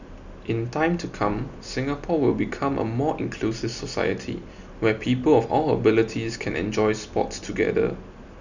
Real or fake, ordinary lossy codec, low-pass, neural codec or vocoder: real; none; 7.2 kHz; none